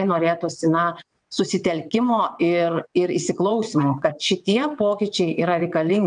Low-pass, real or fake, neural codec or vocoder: 9.9 kHz; fake; vocoder, 22.05 kHz, 80 mel bands, WaveNeXt